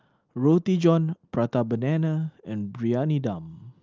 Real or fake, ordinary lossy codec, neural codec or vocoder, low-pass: real; Opus, 32 kbps; none; 7.2 kHz